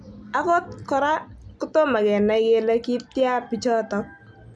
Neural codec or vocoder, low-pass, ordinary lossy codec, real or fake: none; none; none; real